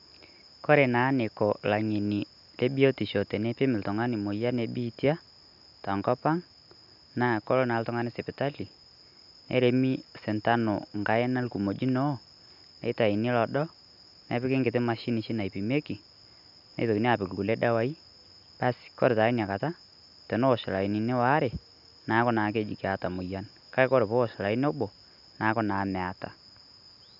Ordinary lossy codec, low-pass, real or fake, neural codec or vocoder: none; 5.4 kHz; real; none